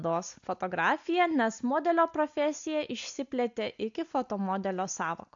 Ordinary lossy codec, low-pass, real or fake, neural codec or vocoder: AAC, 64 kbps; 7.2 kHz; real; none